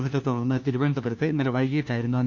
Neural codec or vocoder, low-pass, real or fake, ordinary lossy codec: codec, 16 kHz, 1 kbps, FunCodec, trained on LibriTTS, 50 frames a second; 7.2 kHz; fake; none